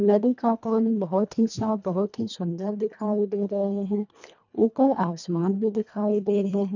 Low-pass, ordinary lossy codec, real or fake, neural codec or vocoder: 7.2 kHz; none; fake; codec, 24 kHz, 1.5 kbps, HILCodec